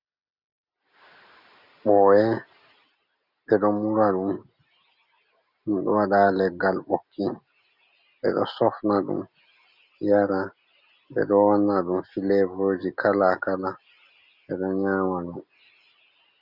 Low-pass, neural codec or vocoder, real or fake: 5.4 kHz; none; real